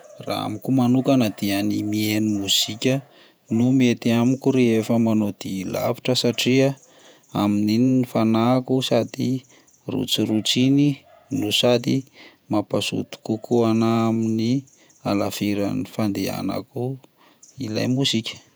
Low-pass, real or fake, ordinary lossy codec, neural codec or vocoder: none; fake; none; vocoder, 48 kHz, 128 mel bands, Vocos